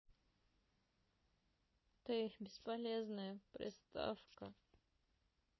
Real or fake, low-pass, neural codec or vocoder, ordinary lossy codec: real; 7.2 kHz; none; MP3, 24 kbps